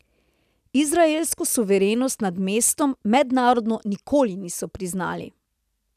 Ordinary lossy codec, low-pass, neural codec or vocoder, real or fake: none; 14.4 kHz; none; real